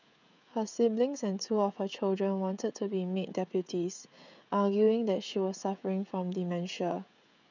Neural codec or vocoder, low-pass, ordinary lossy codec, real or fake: codec, 16 kHz, 16 kbps, FreqCodec, smaller model; 7.2 kHz; none; fake